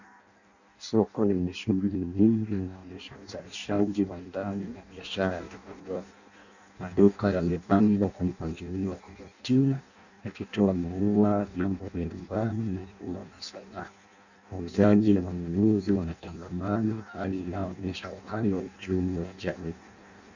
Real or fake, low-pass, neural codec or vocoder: fake; 7.2 kHz; codec, 16 kHz in and 24 kHz out, 0.6 kbps, FireRedTTS-2 codec